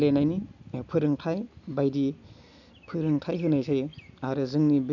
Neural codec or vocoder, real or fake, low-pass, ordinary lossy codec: none; real; 7.2 kHz; none